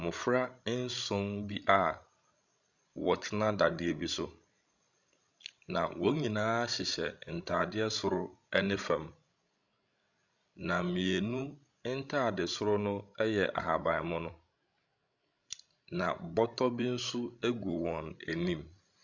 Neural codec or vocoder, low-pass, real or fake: codec, 16 kHz, 16 kbps, FreqCodec, larger model; 7.2 kHz; fake